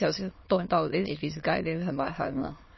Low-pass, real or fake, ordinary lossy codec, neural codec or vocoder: 7.2 kHz; fake; MP3, 24 kbps; autoencoder, 22.05 kHz, a latent of 192 numbers a frame, VITS, trained on many speakers